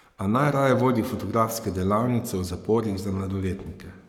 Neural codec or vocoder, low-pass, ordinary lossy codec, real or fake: codec, 44.1 kHz, 7.8 kbps, Pupu-Codec; 19.8 kHz; none; fake